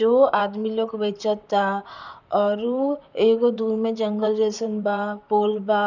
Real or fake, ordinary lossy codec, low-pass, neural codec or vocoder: fake; none; 7.2 kHz; vocoder, 44.1 kHz, 128 mel bands, Pupu-Vocoder